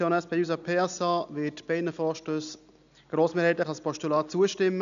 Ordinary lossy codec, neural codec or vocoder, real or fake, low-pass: none; none; real; 7.2 kHz